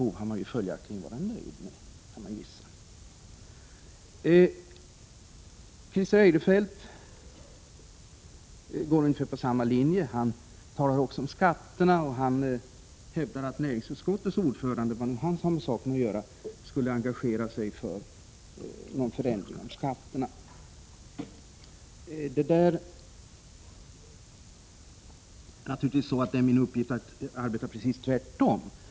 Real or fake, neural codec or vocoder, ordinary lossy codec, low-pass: real; none; none; none